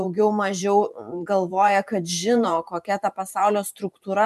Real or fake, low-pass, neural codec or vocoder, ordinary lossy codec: fake; 14.4 kHz; vocoder, 44.1 kHz, 128 mel bands every 512 samples, BigVGAN v2; MP3, 96 kbps